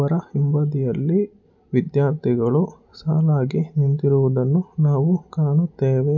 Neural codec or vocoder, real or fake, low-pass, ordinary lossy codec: none; real; 7.2 kHz; none